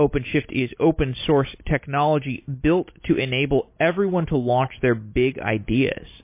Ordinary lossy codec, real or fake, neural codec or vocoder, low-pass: MP3, 24 kbps; real; none; 3.6 kHz